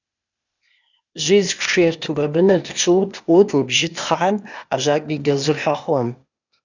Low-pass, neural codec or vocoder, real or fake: 7.2 kHz; codec, 16 kHz, 0.8 kbps, ZipCodec; fake